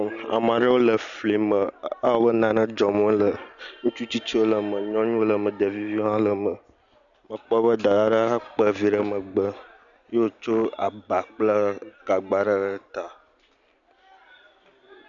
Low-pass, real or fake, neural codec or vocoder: 7.2 kHz; real; none